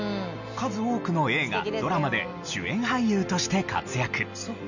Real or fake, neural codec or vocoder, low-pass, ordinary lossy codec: real; none; 7.2 kHz; none